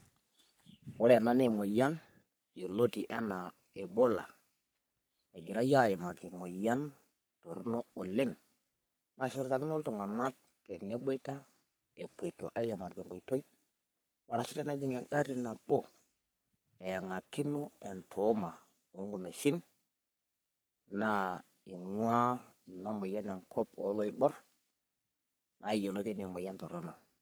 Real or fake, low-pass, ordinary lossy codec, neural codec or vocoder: fake; none; none; codec, 44.1 kHz, 3.4 kbps, Pupu-Codec